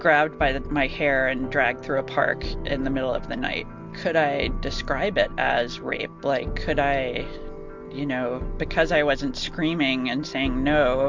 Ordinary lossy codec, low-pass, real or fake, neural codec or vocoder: MP3, 64 kbps; 7.2 kHz; real; none